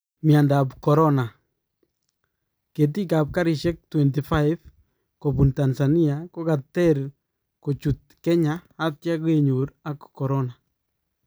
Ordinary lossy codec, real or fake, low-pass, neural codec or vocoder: none; real; none; none